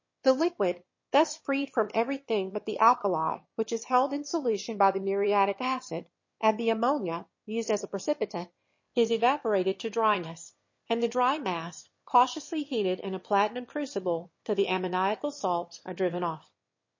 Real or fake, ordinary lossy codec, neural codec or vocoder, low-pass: fake; MP3, 32 kbps; autoencoder, 22.05 kHz, a latent of 192 numbers a frame, VITS, trained on one speaker; 7.2 kHz